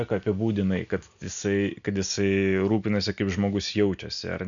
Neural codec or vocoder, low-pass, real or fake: none; 7.2 kHz; real